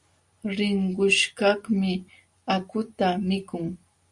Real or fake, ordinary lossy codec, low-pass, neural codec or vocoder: real; Opus, 64 kbps; 10.8 kHz; none